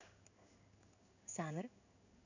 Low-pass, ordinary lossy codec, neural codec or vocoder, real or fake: 7.2 kHz; none; codec, 16 kHz in and 24 kHz out, 1 kbps, XY-Tokenizer; fake